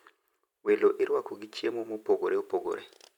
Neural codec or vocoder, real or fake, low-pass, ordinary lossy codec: vocoder, 44.1 kHz, 128 mel bands every 256 samples, BigVGAN v2; fake; 19.8 kHz; none